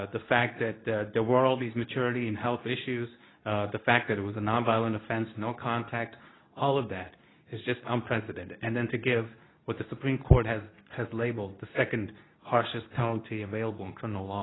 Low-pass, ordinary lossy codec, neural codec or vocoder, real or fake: 7.2 kHz; AAC, 16 kbps; codec, 24 kHz, 0.9 kbps, WavTokenizer, medium speech release version 2; fake